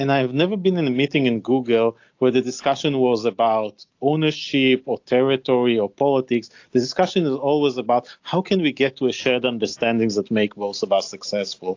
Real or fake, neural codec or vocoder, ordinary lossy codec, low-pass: real; none; AAC, 48 kbps; 7.2 kHz